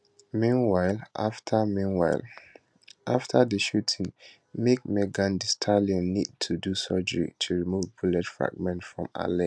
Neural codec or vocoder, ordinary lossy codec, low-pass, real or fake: none; none; none; real